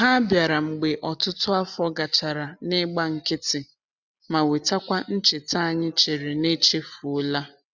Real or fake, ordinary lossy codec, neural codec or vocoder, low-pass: real; none; none; 7.2 kHz